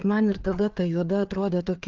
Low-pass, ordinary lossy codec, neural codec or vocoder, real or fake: 7.2 kHz; Opus, 32 kbps; codec, 16 kHz, 4 kbps, FreqCodec, larger model; fake